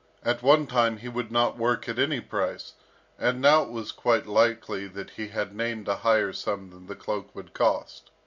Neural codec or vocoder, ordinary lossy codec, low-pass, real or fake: none; MP3, 64 kbps; 7.2 kHz; real